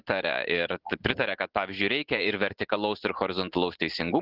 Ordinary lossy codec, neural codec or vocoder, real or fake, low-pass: Opus, 16 kbps; none; real; 5.4 kHz